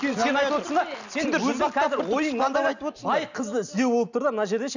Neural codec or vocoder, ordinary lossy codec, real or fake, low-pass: none; none; real; 7.2 kHz